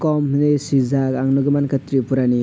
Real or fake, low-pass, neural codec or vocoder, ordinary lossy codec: real; none; none; none